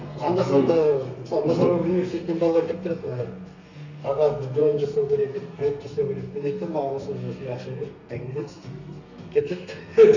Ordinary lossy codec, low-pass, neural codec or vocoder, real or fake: none; 7.2 kHz; codec, 32 kHz, 1.9 kbps, SNAC; fake